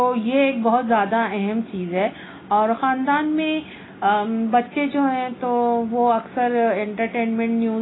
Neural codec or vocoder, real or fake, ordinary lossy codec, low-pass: none; real; AAC, 16 kbps; 7.2 kHz